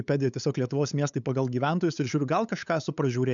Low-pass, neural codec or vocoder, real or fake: 7.2 kHz; codec, 16 kHz, 8 kbps, FunCodec, trained on LibriTTS, 25 frames a second; fake